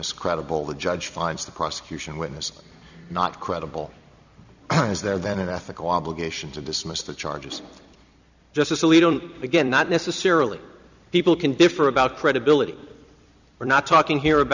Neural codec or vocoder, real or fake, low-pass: vocoder, 44.1 kHz, 128 mel bands every 256 samples, BigVGAN v2; fake; 7.2 kHz